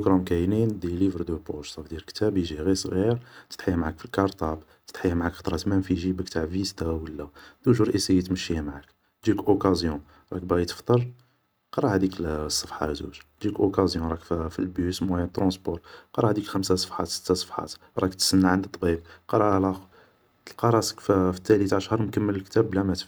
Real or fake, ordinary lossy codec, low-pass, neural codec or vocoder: real; none; none; none